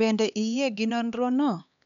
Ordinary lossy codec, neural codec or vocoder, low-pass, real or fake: none; codec, 16 kHz, 2 kbps, X-Codec, HuBERT features, trained on LibriSpeech; 7.2 kHz; fake